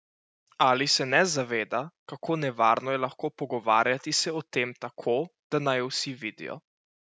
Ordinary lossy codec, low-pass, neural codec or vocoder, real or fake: none; none; none; real